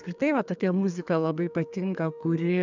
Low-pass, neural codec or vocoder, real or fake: 7.2 kHz; codec, 32 kHz, 1.9 kbps, SNAC; fake